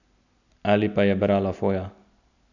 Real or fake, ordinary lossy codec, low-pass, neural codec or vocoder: real; none; 7.2 kHz; none